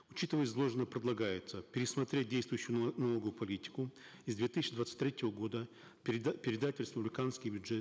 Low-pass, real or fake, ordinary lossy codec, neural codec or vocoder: none; real; none; none